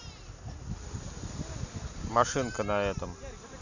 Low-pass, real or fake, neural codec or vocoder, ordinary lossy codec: 7.2 kHz; real; none; none